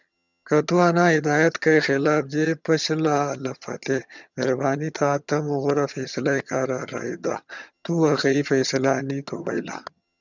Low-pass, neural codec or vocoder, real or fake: 7.2 kHz; vocoder, 22.05 kHz, 80 mel bands, HiFi-GAN; fake